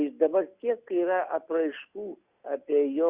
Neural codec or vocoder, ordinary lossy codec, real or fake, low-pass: none; Opus, 64 kbps; real; 3.6 kHz